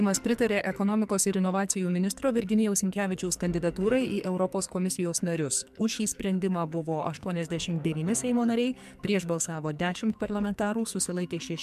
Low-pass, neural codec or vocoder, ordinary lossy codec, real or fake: 14.4 kHz; codec, 44.1 kHz, 2.6 kbps, SNAC; MP3, 96 kbps; fake